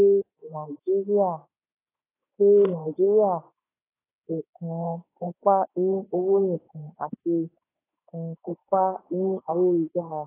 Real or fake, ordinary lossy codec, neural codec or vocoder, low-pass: fake; AAC, 16 kbps; autoencoder, 48 kHz, 32 numbers a frame, DAC-VAE, trained on Japanese speech; 3.6 kHz